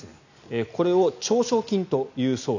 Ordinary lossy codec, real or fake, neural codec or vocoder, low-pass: none; real; none; 7.2 kHz